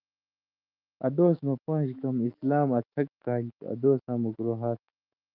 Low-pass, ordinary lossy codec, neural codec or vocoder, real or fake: 5.4 kHz; Opus, 24 kbps; autoencoder, 48 kHz, 128 numbers a frame, DAC-VAE, trained on Japanese speech; fake